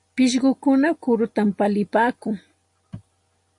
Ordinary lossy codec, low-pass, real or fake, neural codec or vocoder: MP3, 48 kbps; 10.8 kHz; real; none